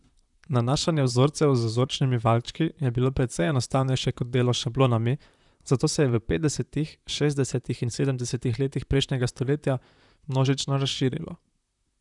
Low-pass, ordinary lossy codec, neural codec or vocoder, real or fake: 10.8 kHz; none; vocoder, 44.1 kHz, 128 mel bands, Pupu-Vocoder; fake